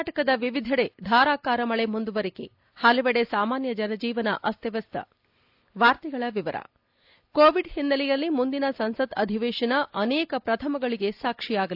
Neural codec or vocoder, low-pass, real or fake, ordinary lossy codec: none; 5.4 kHz; real; AAC, 48 kbps